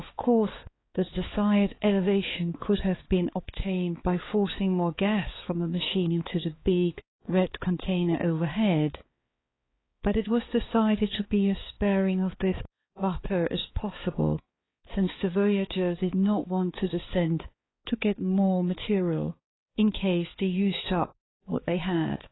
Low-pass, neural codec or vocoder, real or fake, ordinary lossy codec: 7.2 kHz; codec, 16 kHz, 4 kbps, X-Codec, HuBERT features, trained on balanced general audio; fake; AAC, 16 kbps